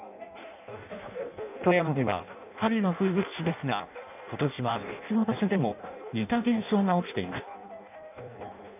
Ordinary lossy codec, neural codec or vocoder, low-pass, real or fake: none; codec, 16 kHz in and 24 kHz out, 0.6 kbps, FireRedTTS-2 codec; 3.6 kHz; fake